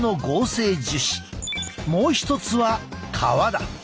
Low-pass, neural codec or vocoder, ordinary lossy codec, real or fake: none; none; none; real